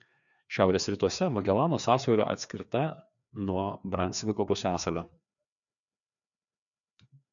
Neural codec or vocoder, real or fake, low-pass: codec, 16 kHz, 2 kbps, FreqCodec, larger model; fake; 7.2 kHz